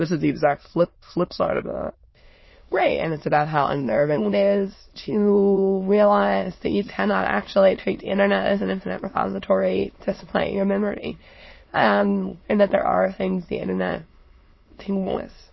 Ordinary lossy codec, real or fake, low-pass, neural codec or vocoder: MP3, 24 kbps; fake; 7.2 kHz; autoencoder, 22.05 kHz, a latent of 192 numbers a frame, VITS, trained on many speakers